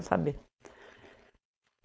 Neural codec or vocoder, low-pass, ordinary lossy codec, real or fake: codec, 16 kHz, 4.8 kbps, FACodec; none; none; fake